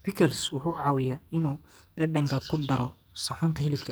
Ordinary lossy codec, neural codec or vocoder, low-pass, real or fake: none; codec, 44.1 kHz, 2.6 kbps, SNAC; none; fake